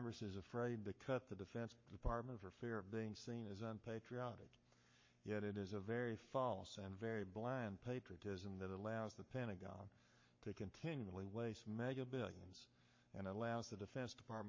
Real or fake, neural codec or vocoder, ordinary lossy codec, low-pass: fake; codec, 44.1 kHz, 7.8 kbps, Pupu-Codec; MP3, 32 kbps; 7.2 kHz